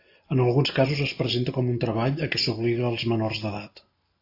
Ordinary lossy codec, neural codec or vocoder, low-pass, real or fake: AAC, 32 kbps; none; 5.4 kHz; real